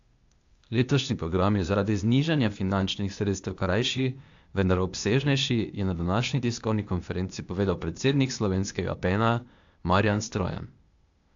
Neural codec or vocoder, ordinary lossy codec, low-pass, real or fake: codec, 16 kHz, 0.8 kbps, ZipCodec; AAC, 64 kbps; 7.2 kHz; fake